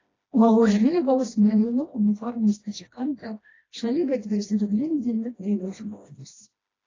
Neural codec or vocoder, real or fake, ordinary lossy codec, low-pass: codec, 16 kHz, 1 kbps, FreqCodec, smaller model; fake; AAC, 32 kbps; 7.2 kHz